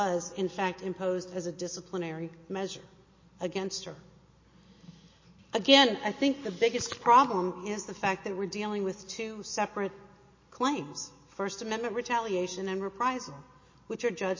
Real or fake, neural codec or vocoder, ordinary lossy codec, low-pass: real; none; MP3, 32 kbps; 7.2 kHz